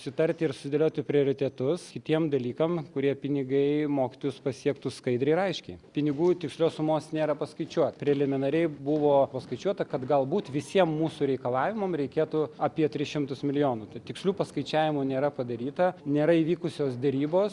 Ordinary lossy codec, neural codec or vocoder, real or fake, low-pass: Opus, 64 kbps; none; real; 10.8 kHz